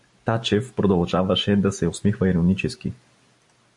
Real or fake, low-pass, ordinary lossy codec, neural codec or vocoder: real; 10.8 kHz; MP3, 64 kbps; none